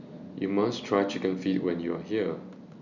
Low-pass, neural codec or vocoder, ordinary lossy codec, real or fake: 7.2 kHz; none; none; real